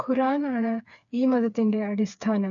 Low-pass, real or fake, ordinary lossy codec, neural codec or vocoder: 7.2 kHz; fake; none; codec, 16 kHz, 4 kbps, FreqCodec, smaller model